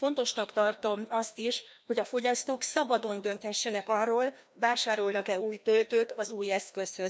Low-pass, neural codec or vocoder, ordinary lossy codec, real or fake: none; codec, 16 kHz, 1 kbps, FreqCodec, larger model; none; fake